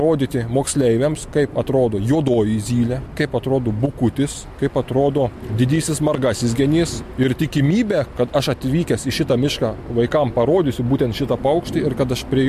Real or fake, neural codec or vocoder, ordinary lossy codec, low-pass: real; none; MP3, 64 kbps; 14.4 kHz